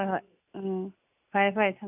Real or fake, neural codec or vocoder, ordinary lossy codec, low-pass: real; none; none; 3.6 kHz